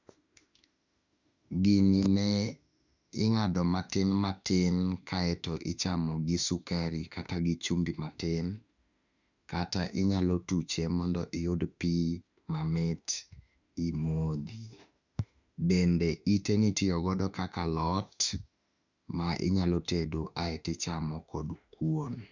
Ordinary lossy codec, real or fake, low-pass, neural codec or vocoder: none; fake; 7.2 kHz; autoencoder, 48 kHz, 32 numbers a frame, DAC-VAE, trained on Japanese speech